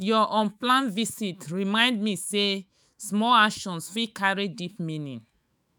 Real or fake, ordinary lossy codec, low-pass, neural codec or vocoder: fake; none; none; autoencoder, 48 kHz, 128 numbers a frame, DAC-VAE, trained on Japanese speech